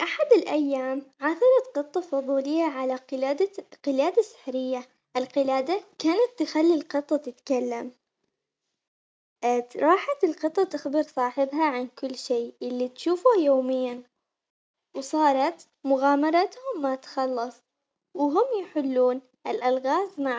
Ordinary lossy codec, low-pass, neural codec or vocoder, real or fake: none; none; none; real